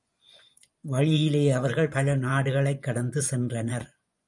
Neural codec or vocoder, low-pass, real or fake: vocoder, 24 kHz, 100 mel bands, Vocos; 10.8 kHz; fake